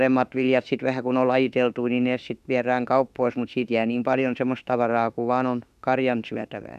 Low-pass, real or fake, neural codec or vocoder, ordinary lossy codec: 14.4 kHz; fake; autoencoder, 48 kHz, 32 numbers a frame, DAC-VAE, trained on Japanese speech; none